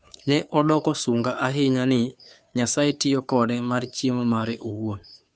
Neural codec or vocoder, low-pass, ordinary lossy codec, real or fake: codec, 16 kHz, 2 kbps, FunCodec, trained on Chinese and English, 25 frames a second; none; none; fake